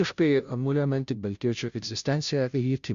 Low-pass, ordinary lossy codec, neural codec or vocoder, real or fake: 7.2 kHz; Opus, 64 kbps; codec, 16 kHz, 0.5 kbps, FunCodec, trained on Chinese and English, 25 frames a second; fake